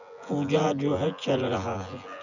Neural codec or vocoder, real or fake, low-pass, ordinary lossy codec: vocoder, 24 kHz, 100 mel bands, Vocos; fake; 7.2 kHz; none